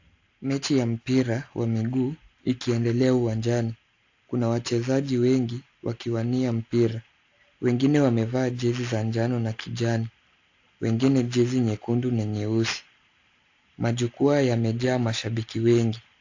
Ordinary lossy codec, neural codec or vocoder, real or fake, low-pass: AAC, 48 kbps; none; real; 7.2 kHz